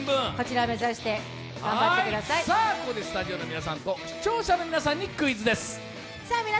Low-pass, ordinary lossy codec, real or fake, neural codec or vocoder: none; none; real; none